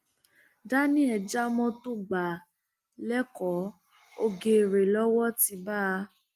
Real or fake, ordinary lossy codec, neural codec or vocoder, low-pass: real; Opus, 32 kbps; none; 14.4 kHz